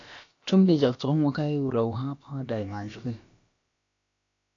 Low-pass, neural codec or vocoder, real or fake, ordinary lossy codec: 7.2 kHz; codec, 16 kHz, about 1 kbps, DyCAST, with the encoder's durations; fake; AAC, 48 kbps